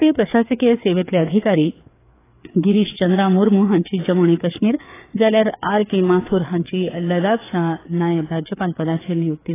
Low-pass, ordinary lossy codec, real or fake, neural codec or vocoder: 3.6 kHz; AAC, 16 kbps; fake; codec, 16 kHz, 4 kbps, FreqCodec, larger model